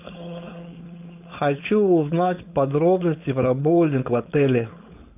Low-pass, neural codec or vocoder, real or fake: 3.6 kHz; codec, 16 kHz, 4.8 kbps, FACodec; fake